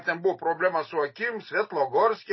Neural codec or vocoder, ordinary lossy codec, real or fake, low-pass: none; MP3, 24 kbps; real; 7.2 kHz